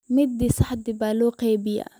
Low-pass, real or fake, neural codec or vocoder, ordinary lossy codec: none; real; none; none